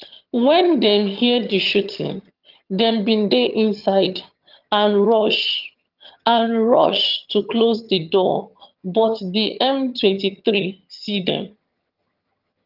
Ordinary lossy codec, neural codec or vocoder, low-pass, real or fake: Opus, 24 kbps; vocoder, 22.05 kHz, 80 mel bands, HiFi-GAN; 5.4 kHz; fake